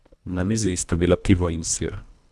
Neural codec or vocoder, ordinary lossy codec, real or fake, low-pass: codec, 24 kHz, 1.5 kbps, HILCodec; none; fake; none